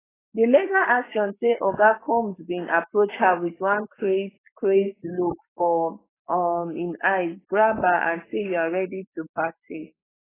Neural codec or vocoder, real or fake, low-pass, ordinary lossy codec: codec, 44.1 kHz, 7.8 kbps, Pupu-Codec; fake; 3.6 kHz; AAC, 16 kbps